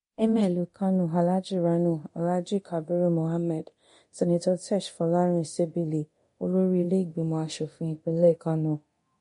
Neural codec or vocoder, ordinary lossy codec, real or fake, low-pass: codec, 24 kHz, 0.9 kbps, DualCodec; MP3, 48 kbps; fake; 10.8 kHz